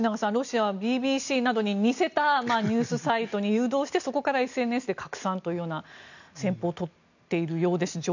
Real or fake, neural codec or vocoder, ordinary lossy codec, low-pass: real; none; none; 7.2 kHz